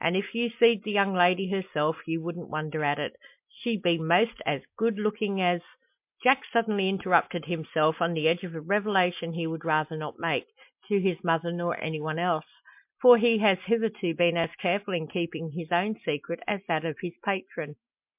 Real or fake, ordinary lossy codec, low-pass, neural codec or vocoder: real; MP3, 32 kbps; 3.6 kHz; none